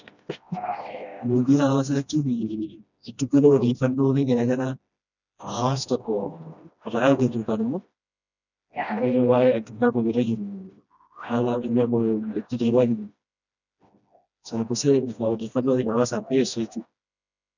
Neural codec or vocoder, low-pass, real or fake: codec, 16 kHz, 1 kbps, FreqCodec, smaller model; 7.2 kHz; fake